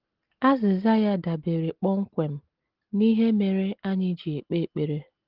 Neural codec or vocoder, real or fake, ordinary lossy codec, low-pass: none; real; Opus, 16 kbps; 5.4 kHz